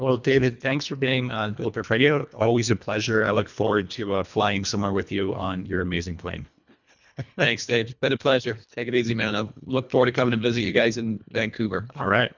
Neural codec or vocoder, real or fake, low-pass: codec, 24 kHz, 1.5 kbps, HILCodec; fake; 7.2 kHz